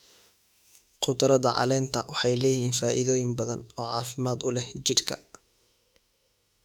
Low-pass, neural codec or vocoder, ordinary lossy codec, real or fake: 19.8 kHz; autoencoder, 48 kHz, 32 numbers a frame, DAC-VAE, trained on Japanese speech; none; fake